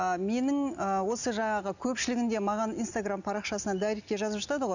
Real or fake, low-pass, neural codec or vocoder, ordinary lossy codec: real; 7.2 kHz; none; none